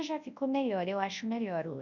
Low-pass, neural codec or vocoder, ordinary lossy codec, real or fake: 7.2 kHz; codec, 24 kHz, 0.9 kbps, WavTokenizer, large speech release; none; fake